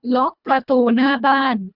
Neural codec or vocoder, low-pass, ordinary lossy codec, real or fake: codec, 24 kHz, 1.5 kbps, HILCodec; 5.4 kHz; none; fake